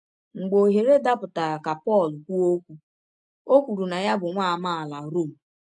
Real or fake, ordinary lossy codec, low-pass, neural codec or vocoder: real; AAC, 64 kbps; 10.8 kHz; none